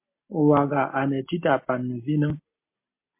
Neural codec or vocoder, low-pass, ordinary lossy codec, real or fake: none; 3.6 kHz; MP3, 24 kbps; real